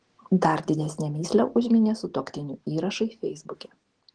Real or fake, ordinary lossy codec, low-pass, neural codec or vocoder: real; Opus, 16 kbps; 9.9 kHz; none